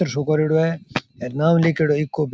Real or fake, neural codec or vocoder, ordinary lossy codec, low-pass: real; none; none; none